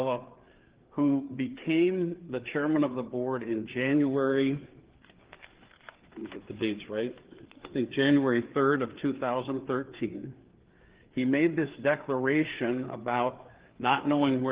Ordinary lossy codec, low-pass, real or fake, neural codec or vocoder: Opus, 16 kbps; 3.6 kHz; fake; codec, 16 kHz, 4 kbps, FreqCodec, larger model